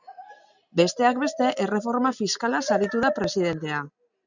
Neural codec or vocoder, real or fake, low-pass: none; real; 7.2 kHz